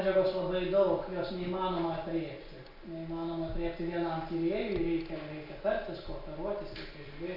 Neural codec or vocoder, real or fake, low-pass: none; real; 5.4 kHz